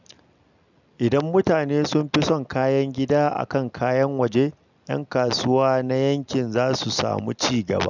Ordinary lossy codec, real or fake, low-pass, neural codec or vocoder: none; real; 7.2 kHz; none